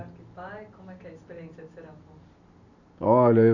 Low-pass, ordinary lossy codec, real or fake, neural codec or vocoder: 7.2 kHz; none; real; none